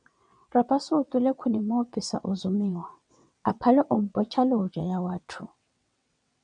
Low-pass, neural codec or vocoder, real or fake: 9.9 kHz; vocoder, 22.05 kHz, 80 mel bands, WaveNeXt; fake